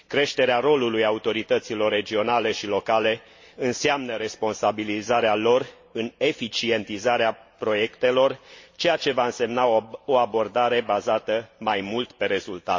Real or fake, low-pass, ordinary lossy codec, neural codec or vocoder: real; 7.2 kHz; MP3, 32 kbps; none